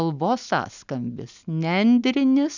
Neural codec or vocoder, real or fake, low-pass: none; real; 7.2 kHz